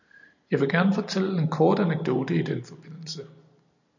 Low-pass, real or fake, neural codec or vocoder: 7.2 kHz; real; none